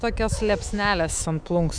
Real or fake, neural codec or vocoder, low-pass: fake; autoencoder, 48 kHz, 128 numbers a frame, DAC-VAE, trained on Japanese speech; 9.9 kHz